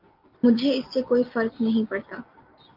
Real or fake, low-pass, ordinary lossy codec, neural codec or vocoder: real; 5.4 kHz; Opus, 32 kbps; none